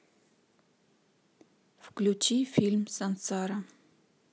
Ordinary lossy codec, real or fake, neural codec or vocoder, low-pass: none; real; none; none